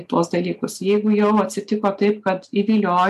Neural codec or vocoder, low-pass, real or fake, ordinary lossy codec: none; 14.4 kHz; real; AAC, 96 kbps